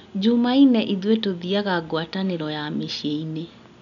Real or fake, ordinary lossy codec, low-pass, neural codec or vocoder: real; none; 7.2 kHz; none